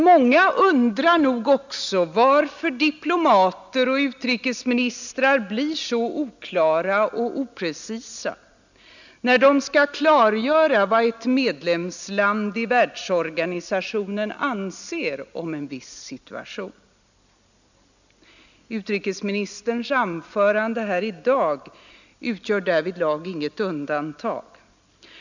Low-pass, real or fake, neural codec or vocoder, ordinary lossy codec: 7.2 kHz; real; none; none